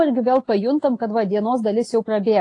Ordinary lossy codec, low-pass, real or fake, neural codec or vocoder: AAC, 48 kbps; 10.8 kHz; real; none